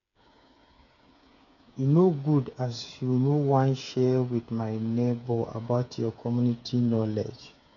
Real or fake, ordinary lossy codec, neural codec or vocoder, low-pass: fake; MP3, 96 kbps; codec, 16 kHz, 8 kbps, FreqCodec, smaller model; 7.2 kHz